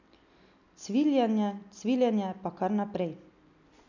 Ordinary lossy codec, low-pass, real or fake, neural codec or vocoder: none; 7.2 kHz; real; none